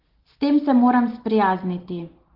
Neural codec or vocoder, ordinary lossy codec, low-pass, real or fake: none; Opus, 16 kbps; 5.4 kHz; real